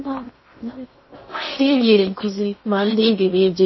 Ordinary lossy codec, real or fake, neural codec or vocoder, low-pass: MP3, 24 kbps; fake; codec, 16 kHz in and 24 kHz out, 0.6 kbps, FocalCodec, streaming, 4096 codes; 7.2 kHz